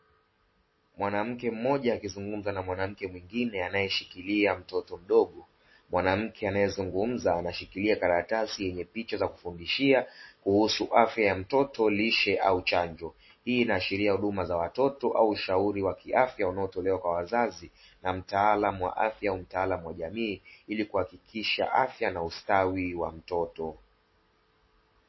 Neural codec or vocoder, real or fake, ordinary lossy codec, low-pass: none; real; MP3, 24 kbps; 7.2 kHz